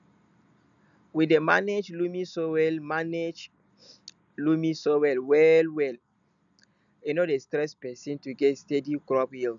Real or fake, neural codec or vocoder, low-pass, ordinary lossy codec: real; none; 7.2 kHz; none